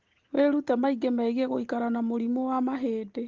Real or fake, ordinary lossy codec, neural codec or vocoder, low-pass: real; Opus, 16 kbps; none; 7.2 kHz